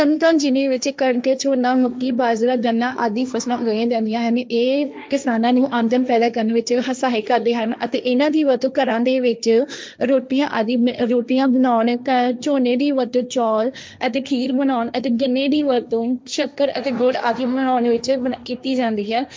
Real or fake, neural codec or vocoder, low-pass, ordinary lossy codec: fake; codec, 16 kHz, 1.1 kbps, Voila-Tokenizer; none; none